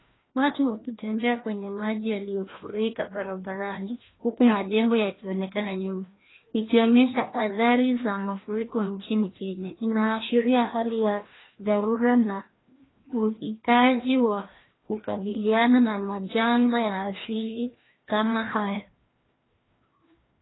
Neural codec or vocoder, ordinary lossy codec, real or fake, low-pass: codec, 16 kHz, 1 kbps, FreqCodec, larger model; AAC, 16 kbps; fake; 7.2 kHz